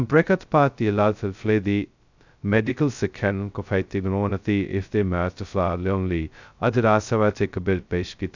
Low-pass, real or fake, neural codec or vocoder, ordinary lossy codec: 7.2 kHz; fake; codec, 16 kHz, 0.2 kbps, FocalCodec; none